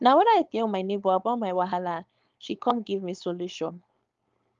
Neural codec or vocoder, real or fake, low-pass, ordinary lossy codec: codec, 16 kHz, 4.8 kbps, FACodec; fake; 7.2 kHz; Opus, 32 kbps